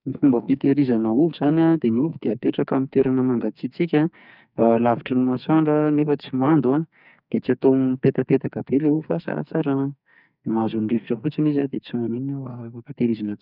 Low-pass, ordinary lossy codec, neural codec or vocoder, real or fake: 5.4 kHz; none; codec, 44.1 kHz, 2.6 kbps, SNAC; fake